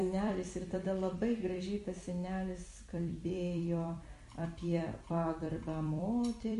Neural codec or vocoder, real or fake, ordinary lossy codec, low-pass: vocoder, 44.1 kHz, 128 mel bands every 256 samples, BigVGAN v2; fake; MP3, 48 kbps; 14.4 kHz